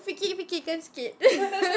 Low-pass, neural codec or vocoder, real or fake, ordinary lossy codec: none; none; real; none